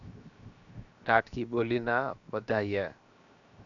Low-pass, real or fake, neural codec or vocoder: 7.2 kHz; fake; codec, 16 kHz, 0.7 kbps, FocalCodec